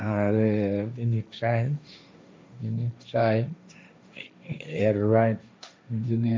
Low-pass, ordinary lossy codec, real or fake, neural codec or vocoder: none; none; fake; codec, 16 kHz, 1.1 kbps, Voila-Tokenizer